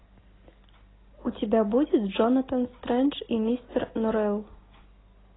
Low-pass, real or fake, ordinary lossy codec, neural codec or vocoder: 7.2 kHz; real; AAC, 16 kbps; none